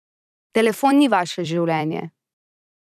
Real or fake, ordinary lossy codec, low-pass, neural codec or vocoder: fake; none; 14.4 kHz; vocoder, 44.1 kHz, 128 mel bands every 256 samples, BigVGAN v2